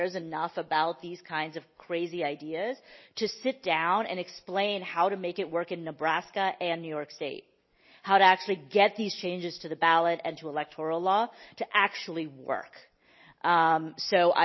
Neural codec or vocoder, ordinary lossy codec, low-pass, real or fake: none; MP3, 24 kbps; 7.2 kHz; real